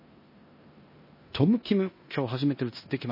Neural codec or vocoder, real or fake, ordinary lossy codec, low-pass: codec, 16 kHz in and 24 kHz out, 0.9 kbps, LongCat-Audio-Codec, four codebook decoder; fake; MP3, 24 kbps; 5.4 kHz